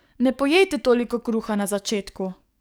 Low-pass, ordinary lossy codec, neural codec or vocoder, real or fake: none; none; codec, 44.1 kHz, 7.8 kbps, DAC; fake